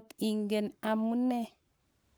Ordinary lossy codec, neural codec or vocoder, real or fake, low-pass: none; codec, 44.1 kHz, 7.8 kbps, Pupu-Codec; fake; none